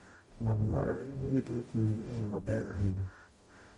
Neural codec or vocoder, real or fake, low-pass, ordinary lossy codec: codec, 44.1 kHz, 0.9 kbps, DAC; fake; 19.8 kHz; MP3, 48 kbps